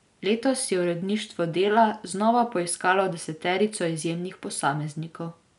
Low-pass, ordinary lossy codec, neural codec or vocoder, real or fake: 10.8 kHz; none; none; real